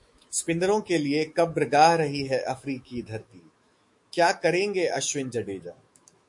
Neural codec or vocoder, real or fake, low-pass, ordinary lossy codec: autoencoder, 48 kHz, 128 numbers a frame, DAC-VAE, trained on Japanese speech; fake; 10.8 kHz; MP3, 48 kbps